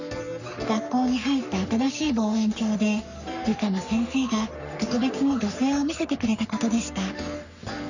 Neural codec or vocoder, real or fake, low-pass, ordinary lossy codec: codec, 44.1 kHz, 3.4 kbps, Pupu-Codec; fake; 7.2 kHz; none